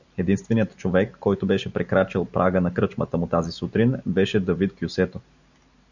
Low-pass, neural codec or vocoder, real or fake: 7.2 kHz; none; real